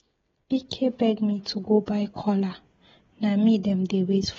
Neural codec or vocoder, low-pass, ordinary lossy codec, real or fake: codec, 16 kHz, 16 kbps, FreqCodec, smaller model; 7.2 kHz; AAC, 24 kbps; fake